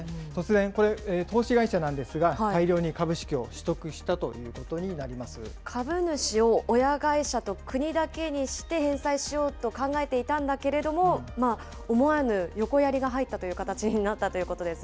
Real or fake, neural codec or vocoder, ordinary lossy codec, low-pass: real; none; none; none